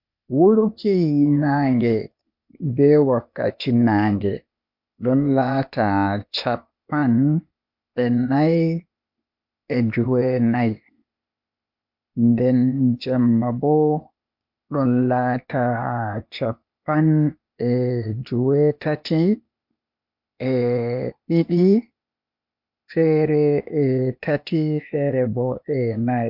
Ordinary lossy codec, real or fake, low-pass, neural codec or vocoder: MP3, 48 kbps; fake; 5.4 kHz; codec, 16 kHz, 0.8 kbps, ZipCodec